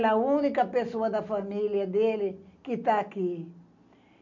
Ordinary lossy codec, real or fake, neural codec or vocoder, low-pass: none; real; none; 7.2 kHz